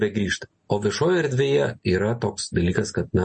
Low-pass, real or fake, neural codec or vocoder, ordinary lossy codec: 10.8 kHz; real; none; MP3, 32 kbps